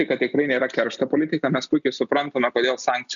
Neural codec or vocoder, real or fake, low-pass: none; real; 7.2 kHz